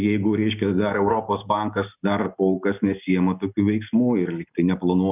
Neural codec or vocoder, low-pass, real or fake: none; 3.6 kHz; real